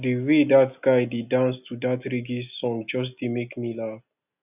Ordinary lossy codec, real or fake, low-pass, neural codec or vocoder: none; real; 3.6 kHz; none